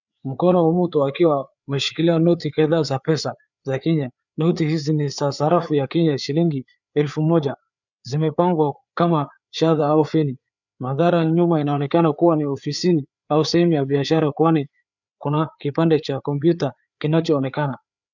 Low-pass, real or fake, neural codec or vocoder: 7.2 kHz; fake; codec, 16 kHz, 4 kbps, FreqCodec, larger model